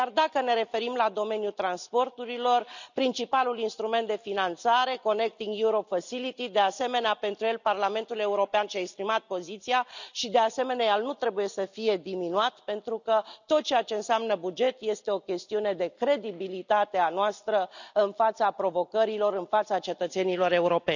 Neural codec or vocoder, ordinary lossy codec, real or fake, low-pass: none; none; real; 7.2 kHz